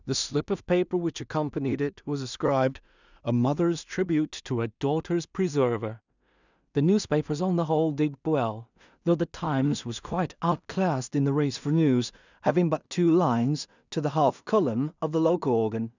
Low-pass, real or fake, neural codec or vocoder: 7.2 kHz; fake; codec, 16 kHz in and 24 kHz out, 0.4 kbps, LongCat-Audio-Codec, two codebook decoder